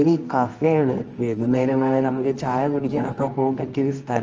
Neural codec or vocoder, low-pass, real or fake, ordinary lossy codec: codec, 24 kHz, 0.9 kbps, WavTokenizer, medium music audio release; 7.2 kHz; fake; Opus, 24 kbps